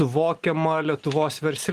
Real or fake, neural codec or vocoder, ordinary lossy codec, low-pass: real; none; Opus, 24 kbps; 14.4 kHz